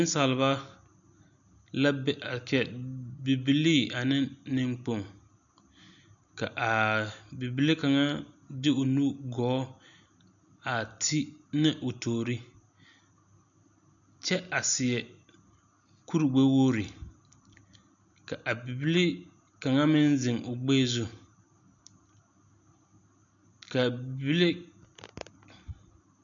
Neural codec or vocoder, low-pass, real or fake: none; 7.2 kHz; real